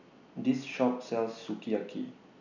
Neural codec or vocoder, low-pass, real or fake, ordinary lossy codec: none; 7.2 kHz; real; none